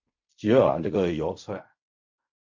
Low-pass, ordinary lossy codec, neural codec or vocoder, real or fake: 7.2 kHz; MP3, 48 kbps; codec, 16 kHz in and 24 kHz out, 0.4 kbps, LongCat-Audio-Codec, fine tuned four codebook decoder; fake